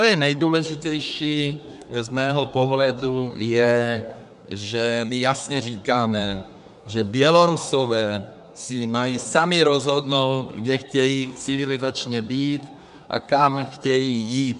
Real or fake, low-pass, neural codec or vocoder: fake; 10.8 kHz; codec, 24 kHz, 1 kbps, SNAC